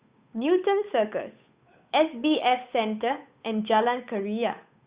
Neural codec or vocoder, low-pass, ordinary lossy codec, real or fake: codec, 16 kHz, 8 kbps, FunCodec, trained on Chinese and English, 25 frames a second; 3.6 kHz; Opus, 64 kbps; fake